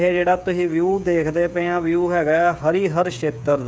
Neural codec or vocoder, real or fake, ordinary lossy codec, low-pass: codec, 16 kHz, 8 kbps, FreqCodec, smaller model; fake; none; none